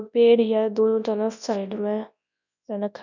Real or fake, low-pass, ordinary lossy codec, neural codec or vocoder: fake; 7.2 kHz; none; codec, 24 kHz, 0.9 kbps, WavTokenizer, large speech release